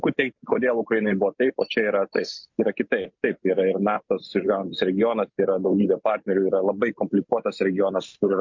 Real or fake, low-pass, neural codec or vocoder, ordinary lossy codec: real; 7.2 kHz; none; MP3, 48 kbps